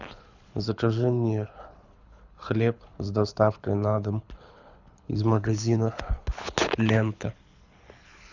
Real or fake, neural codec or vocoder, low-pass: fake; codec, 24 kHz, 6 kbps, HILCodec; 7.2 kHz